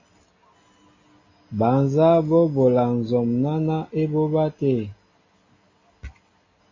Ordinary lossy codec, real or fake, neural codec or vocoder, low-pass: AAC, 32 kbps; real; none; 7.2 kHz